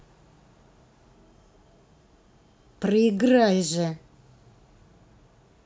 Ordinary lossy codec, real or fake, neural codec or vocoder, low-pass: none; real; none; none